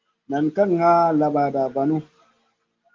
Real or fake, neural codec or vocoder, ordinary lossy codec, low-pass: real; none; Opus, 24 kbps; 7.2 kHz